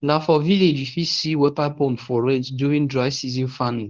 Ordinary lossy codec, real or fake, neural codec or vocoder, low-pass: Opus, 32 kbps; fake; codec, 24 kHz, 0.9 kbps, WavTokenizer, medium speech release version 1; 7.2 kHz